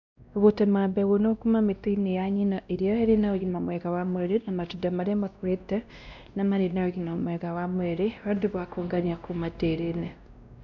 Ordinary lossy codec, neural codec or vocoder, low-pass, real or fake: none; codec, 16 kHz, 1 kbps, X-Codec, WavLM features, trained on Multilingual LibriSpeech; 7.2 kHz; fake